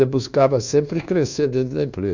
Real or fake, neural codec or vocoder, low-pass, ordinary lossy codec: fake; codec, 24 kHz, 1.2 kbps, DualCodec; 7.2 kHz; none